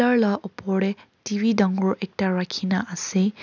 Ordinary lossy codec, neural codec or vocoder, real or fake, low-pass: none; none; real; 7.2 kHz